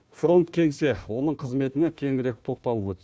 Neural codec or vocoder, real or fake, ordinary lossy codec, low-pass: codec, 16 kHz, 1 kbps, FunCodec, trained on Chinese and English, 50 frames a second; fake; none; none